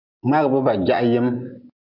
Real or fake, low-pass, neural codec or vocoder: real; 5.4 kHz; none